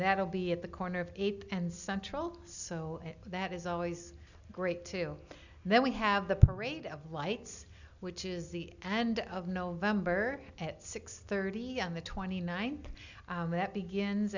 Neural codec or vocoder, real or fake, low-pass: none; real; 7.2 kHz